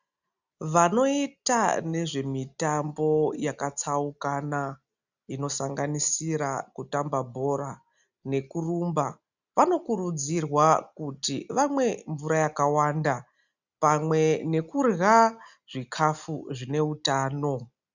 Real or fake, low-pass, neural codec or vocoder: real; 7.2 kHz; none